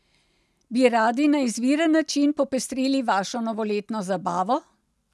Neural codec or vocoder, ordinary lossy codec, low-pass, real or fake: none; none; none; real